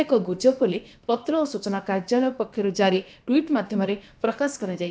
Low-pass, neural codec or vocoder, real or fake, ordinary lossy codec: none; codec, 16 kHz, about 1 kbps, DyCAST, with the encoder's durations; fake; none